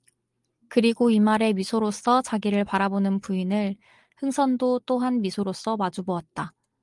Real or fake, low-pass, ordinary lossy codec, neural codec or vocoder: real; 10.8 kHz; Opus, 32 kbps; none